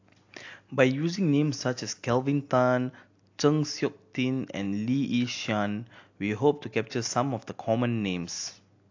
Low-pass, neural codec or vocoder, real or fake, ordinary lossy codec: 7.2 kHz; none; real; AAC, 48 kbps